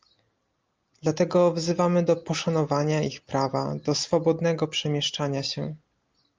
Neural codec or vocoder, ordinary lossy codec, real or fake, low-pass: none; Opus, 24 kbps; real; 7.2 kHz